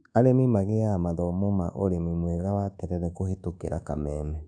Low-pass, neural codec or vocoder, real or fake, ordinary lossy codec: 9.9 kHz; codec, 24 kHz, 1.2 kbps, DualCodec; fake; none